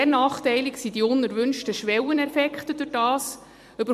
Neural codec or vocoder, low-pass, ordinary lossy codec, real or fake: vocoder, 44.1 kHz, 128 mel bands every 256 samples, BigVGAN v2; 14.4 kHz; MP3, 64 kbps; fake